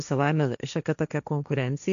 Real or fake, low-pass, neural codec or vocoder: fake; 7.2 kHz; codec, 16 kHz, 1.1 kbps, Voila-Tokenizer